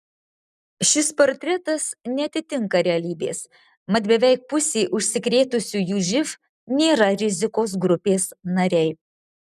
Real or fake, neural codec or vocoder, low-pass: real; none; 14.4 kHz